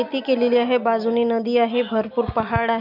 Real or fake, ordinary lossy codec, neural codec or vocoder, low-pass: real; none; none; 5.4 kHz